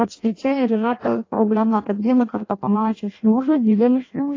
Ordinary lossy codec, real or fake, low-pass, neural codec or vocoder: AAC, 32 kbps; fake; 7.2 kHz; codec, 16 kHz in and 24 kHz out, 0.6 kbps, FireRedTTS-2 codec